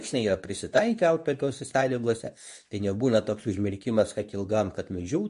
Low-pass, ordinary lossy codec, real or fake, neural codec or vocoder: 10.8 kHz; MP3, 48 kbps; fake; codec, 24 kHz, 0.9 kbps, WavTokenizer, medium speech release version 2